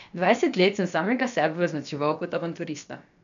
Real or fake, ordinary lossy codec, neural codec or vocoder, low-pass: fake; AAC, 96 kbps; codec, 16 kHz, about 1 kbps, DyCAST, with the encoder's durations; 7.2 kHz